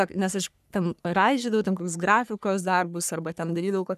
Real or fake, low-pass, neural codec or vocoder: fake; 14.4 kHz; codec, 44.1 kHz, 3.4 kbps, Pupu-Codec